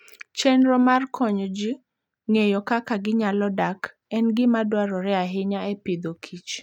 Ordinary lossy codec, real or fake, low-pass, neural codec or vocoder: none; real; 19.8 kHz; none